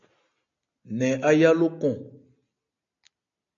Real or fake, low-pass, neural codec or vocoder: real; 7.2 kHz; none